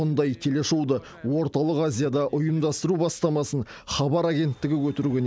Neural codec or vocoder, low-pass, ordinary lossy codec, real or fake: none; none; none; real